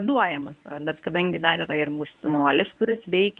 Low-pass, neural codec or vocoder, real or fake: 10.8 kHz; codec, 24 kHz, 0.9 kbps, WavTokenizer, medium speech release version 1; fake